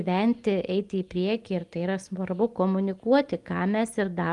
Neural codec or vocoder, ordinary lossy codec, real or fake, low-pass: none; Opus, 32 kbps; real; 10.8 kHz